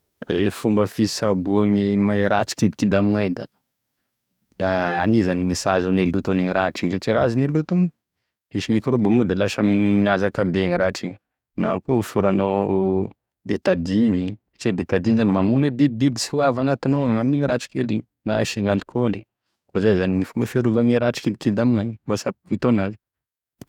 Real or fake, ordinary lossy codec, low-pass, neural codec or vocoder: fake; none; 19.8 kHz; codec, 44.1 kHz, 2.6 kbps, DAC